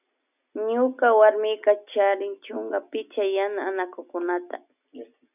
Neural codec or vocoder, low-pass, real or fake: none; 3.6 kHz; real